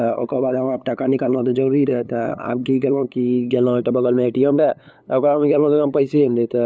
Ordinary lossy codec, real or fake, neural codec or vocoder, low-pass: none; fake; codec, 16 kHz, 8 kbps, FunCodec, trained on LibriTTS, 25 frames a second; none